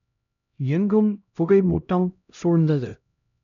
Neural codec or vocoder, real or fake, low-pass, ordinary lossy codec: codec, 16 kHz, 0.5 kbps, X-Codec, HuBERT features, trained on LibriSpeech; fake; 7.2 kHz; none